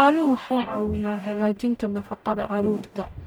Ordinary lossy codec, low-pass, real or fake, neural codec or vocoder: none; none; fake; codec, 44.1 kHz, 0.9 kbps, DAC